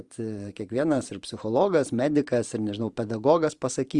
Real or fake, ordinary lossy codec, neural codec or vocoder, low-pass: fake; Opus, 32 kbps; vocoder, 44.1 kHz, 128 mel bands every 512 samples, BigVGAN v2; 10.8 kHz